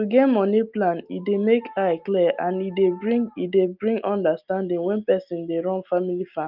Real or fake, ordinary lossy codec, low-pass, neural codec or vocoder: real; Opus, 24 kbps; 5.4 kHz; none